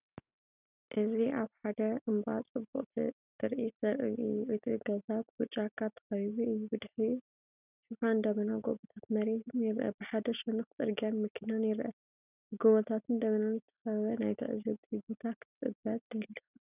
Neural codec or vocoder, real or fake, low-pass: none; real; 3.6 kHz